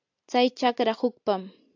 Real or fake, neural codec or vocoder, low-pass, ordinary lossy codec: real; none; 7.2 kHz; AAC, 48 kbps